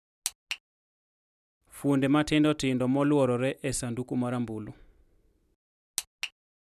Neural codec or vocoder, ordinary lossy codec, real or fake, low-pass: none; none; real; 14.4 kHz